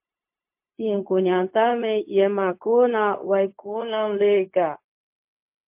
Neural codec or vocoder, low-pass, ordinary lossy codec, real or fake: codec, 16 kHz, 0.4 kbps, LongCat-Audio-Codec; 3.6 kHz; MP3, 32 kbps; fake